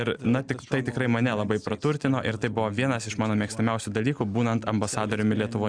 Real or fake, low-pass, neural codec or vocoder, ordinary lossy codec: real; 9.9 kHz; none; Opus, 64 kbps